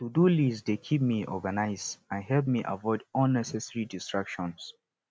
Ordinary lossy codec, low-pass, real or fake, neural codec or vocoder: none; none; real; none